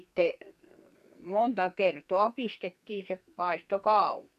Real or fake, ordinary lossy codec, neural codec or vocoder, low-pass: fake; none; codec, 44.1 kHz, 2.6 kbps, SNAC; 14.4 kHz